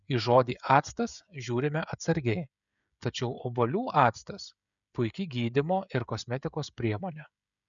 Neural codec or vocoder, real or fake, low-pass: codec, 16 kHz, 16 kbps, FreqCodec, smaller model; fake; 7.2 kHz